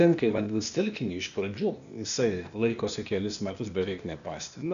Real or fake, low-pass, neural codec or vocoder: fake; 7.2 kHz; codec, 16 kHz, 0.8 kbps, ZipCodec